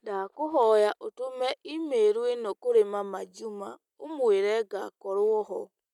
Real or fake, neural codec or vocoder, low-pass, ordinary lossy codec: real; none; 19.8 kHz; none